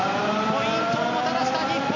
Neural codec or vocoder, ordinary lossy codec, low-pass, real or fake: none; none; 7.2 kHz; real